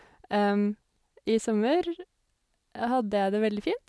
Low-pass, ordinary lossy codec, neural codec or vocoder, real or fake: none; none; none; real